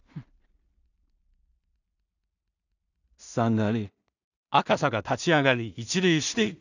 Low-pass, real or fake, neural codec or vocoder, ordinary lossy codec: 7.2 kHz; fake; codec, 16 kHz in and 24 kHz out, 0.4 kbps, LongCat-Audio-Codec, two codebook decoder; none